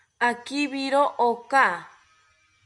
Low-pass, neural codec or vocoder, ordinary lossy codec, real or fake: 10.8 kHz; none; MP3, 64 kbps; real